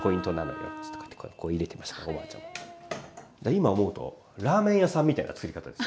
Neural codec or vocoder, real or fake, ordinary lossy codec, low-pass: none; real; none; none